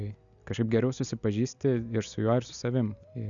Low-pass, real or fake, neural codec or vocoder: 7.2 kHz; real; none